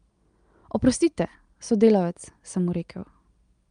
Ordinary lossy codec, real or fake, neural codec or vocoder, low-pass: Opus, 32 kbps; real; none; 9.9 kHz